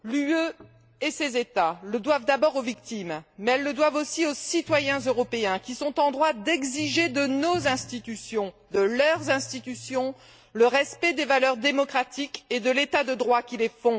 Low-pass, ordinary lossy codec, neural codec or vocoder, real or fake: none; none; none; real